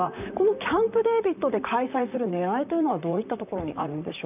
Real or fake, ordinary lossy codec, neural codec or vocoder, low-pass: fake; none; vocoder, 44.1 kHz, 128 mel bands, Pupu-Vocoder; 3.6 kHz